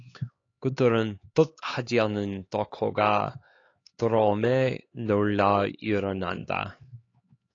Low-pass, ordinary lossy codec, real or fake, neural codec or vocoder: 7.2 kHz; AAC, 32 kbps; fake; codec, 16 kHz, 4 kbps, X-Codec, HuBERT features, trained on LibriSpeech